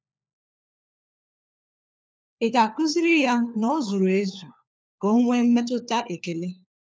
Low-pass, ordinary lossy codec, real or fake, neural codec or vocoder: none; none; fake; codec, 16 kHz, 4 kbps, FunCodec, trained on LibriTTS, 50 frames a second